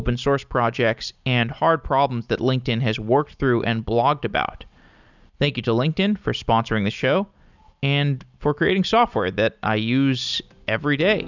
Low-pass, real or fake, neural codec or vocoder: 7.2 kHz; real; none